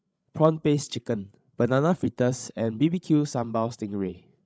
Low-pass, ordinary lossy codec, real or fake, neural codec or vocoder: none; none; fake; codec, 16 kHz, 16 kbps, FreqCodec, larger model